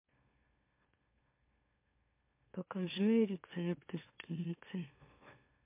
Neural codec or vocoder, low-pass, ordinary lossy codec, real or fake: autoencoder, 44.1 kHz, a latent of 192 numbers a frame, MeloTTS; 3.6 kHz; MP3, 24 kbps; fake